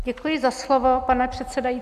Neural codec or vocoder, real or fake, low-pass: none; real; 14.4 kHz